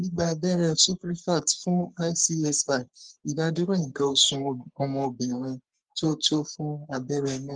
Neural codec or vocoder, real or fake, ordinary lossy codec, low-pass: codec, 44.1 kHz, 3.4 kbps, Pupu-Codec; fake; Opus, 24 kbps; 9.9 kHz